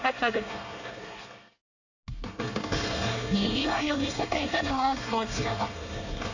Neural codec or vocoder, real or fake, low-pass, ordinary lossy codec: codec, 24 kHz, 1 kbps, SNAC; fake; 7.2 kHz; none